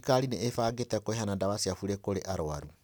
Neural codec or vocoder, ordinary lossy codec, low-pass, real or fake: none; none; none; real